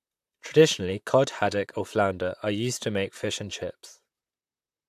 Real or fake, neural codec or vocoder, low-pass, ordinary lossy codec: fake; vocoder, 44.1 kHz, 128 mel bands, Pupu-Vocoder; 14.4 kHz; AAC, 96 kbps